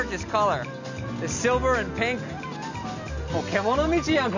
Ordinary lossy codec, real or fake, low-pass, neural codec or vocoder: none; real; 7.2 kHz; none